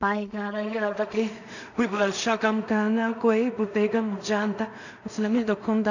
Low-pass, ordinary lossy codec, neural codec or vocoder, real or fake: 7.2 kHz; none; codec, 16 kHz in and 24 kHz out, 0.4 kbps, LongCat-Audio-Codec, two codebook decoder; fake